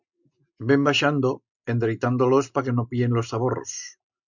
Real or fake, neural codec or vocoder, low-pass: real; none; 7.2 kHz